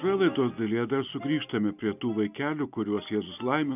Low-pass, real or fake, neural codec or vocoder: 3.6 kHz; real; none